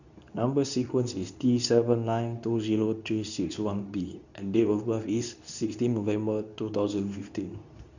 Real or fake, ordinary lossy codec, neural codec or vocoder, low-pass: fake; none; codec, 24 kHz, 0.9 kbps, WavTokenizer, medium speech release version 2; 7.2 kHz